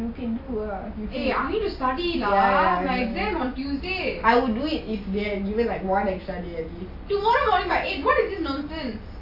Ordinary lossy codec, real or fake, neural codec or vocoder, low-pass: AAC, 32 kbps; real; none; 5.4 kHz